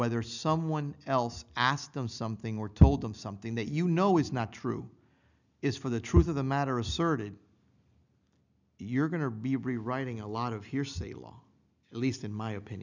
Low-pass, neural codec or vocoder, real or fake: 7.2 kHz; none; real